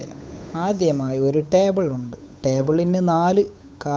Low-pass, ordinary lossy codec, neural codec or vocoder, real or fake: none; none; codec, 16 kHz, 8 kbps, FunCodec, trained on Chinese and English, 25 frames a second; fake